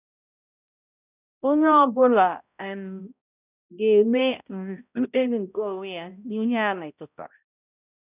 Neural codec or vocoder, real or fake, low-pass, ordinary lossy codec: codec, 16 kHz, 0.5 kbps, X-Codec, HuBERT features, trained on balanced general audio; fake; 3.6 kHz; none